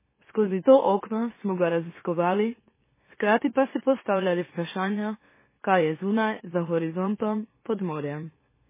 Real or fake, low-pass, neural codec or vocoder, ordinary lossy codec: fake; 3.6 kHz; autoencoder, 44.1 kHz, a latent of 192 numbers a frame, MeloTTS; MP3, 16 kbps